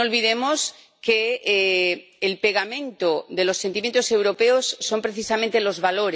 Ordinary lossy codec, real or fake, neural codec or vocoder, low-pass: none; real; none; none